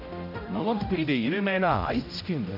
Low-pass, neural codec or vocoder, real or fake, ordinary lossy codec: 5.4 kHz; codec, 16 kHz, 0.5 kbps, X-Codec, HuBERT features, trained on general audio; fake; none